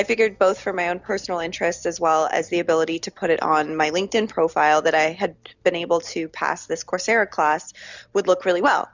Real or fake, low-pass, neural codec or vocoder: real; 7.2 kHz; none